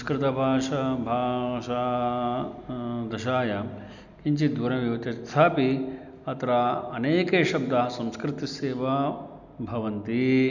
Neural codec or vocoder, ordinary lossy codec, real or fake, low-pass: none; none; real; 7.2 kHz